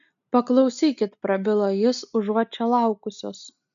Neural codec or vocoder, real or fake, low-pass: none; real; 7.2 kHz